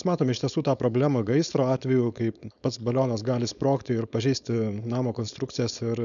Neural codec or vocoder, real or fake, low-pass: codec, 16 kHz, 4.8 kbps, FACodec; fake; 7.2 kHz